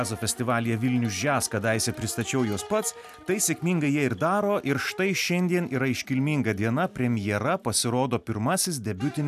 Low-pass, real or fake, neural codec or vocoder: 14.4 kHz; real; none